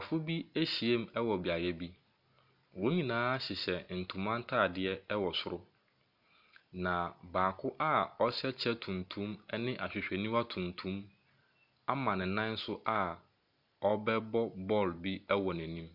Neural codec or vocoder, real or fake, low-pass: none; real; 5.4 kHz